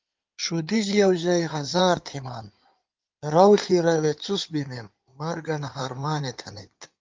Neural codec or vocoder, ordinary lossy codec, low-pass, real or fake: codec, 16 kHz in and 24 kHz out, 2.2 kbps, FireRedTTS-2 codec; Opus, 32 kbps; 7.2 kHz; fake